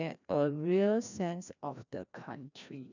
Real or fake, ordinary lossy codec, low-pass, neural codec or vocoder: fake; none; 7.2 kHz; codec, 16 kHz, 1 kbps, FreqCodec, larger model